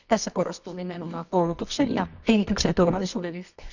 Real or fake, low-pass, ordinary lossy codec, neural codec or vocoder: fake; 7.2 kHz; none; codec, 24 kHz, 0.9 kbps, WavTokenizer, medium music audio release